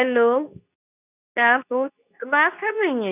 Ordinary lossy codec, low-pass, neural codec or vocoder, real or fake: none; 3.6 kHz; codec, 24 kHz, 0.9 kbps, WavTokenizer, medium speech release version 2; fake